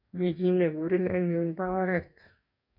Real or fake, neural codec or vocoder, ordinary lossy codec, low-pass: fake; codec, 44.1 kHz, 2.6 kbps, DAC; none; 5.4 kHz